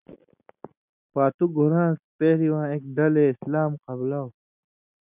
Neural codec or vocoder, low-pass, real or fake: none; 3.6 kHz; real